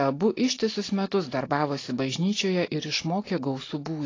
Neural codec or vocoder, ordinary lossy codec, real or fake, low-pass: none; AAC, 32 kbps; real; 7.2 kHz